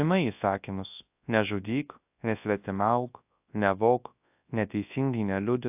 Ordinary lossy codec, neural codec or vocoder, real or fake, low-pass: AAC, 32 kbps; codec, 24 kHz, 0.9 kbps, WavTokenizer, large speech release; fake; 3.6 kHz